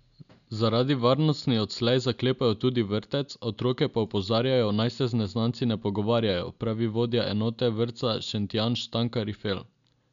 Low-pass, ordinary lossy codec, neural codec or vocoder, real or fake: 7.2 kHz; none; none; real